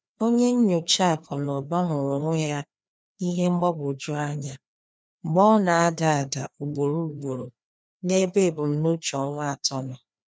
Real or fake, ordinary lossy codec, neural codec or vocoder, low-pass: fake; none; codec, 16 kHz, 2 kbps, FreqCodec, larger model; none